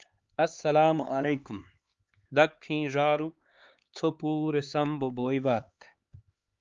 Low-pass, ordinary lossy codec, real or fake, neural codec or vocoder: 7.2 kHz; Opus, 32 kbps; fake; codec, 16 kHz, 4 kbps, X-Codec, HuBERT features, trained on LibriSpeech